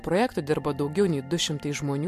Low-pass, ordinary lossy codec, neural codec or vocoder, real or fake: 14.4 kHz; MP3, 64 kbps; none; real